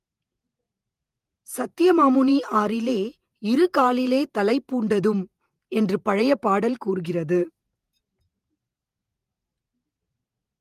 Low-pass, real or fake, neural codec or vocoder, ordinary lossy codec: 14.4 kHz; fake; vocoder, 48 kHz, 128 mel bands, Vocos; Opus, 32 kbps